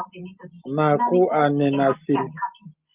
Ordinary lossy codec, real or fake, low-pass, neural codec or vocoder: Opus, 32 kbps; real; 3.6 kHz; none